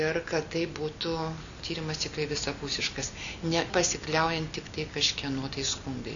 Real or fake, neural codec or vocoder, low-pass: real; none; 7.2 kHz